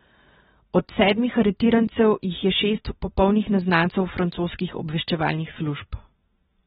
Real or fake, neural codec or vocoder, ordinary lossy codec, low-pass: real; none; AAC, 16 kbps; 7.2 kHz